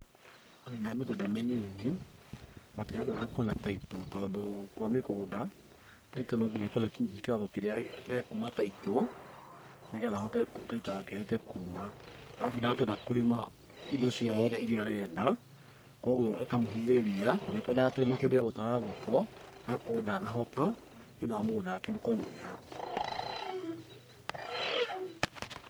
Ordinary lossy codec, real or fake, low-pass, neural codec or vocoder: none; fake; none; codec, 44.1 kHz, 1.7 kbps, Pupu-Codec